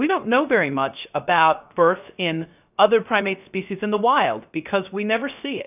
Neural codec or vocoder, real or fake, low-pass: codec, 16 kHz, 0.3 kbps, FocalCodec; fake; 3.6 kHz